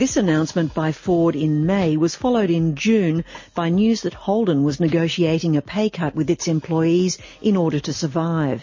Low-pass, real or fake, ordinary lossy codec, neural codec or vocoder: 7.2 kHz; real; MP3, 32 kbps; none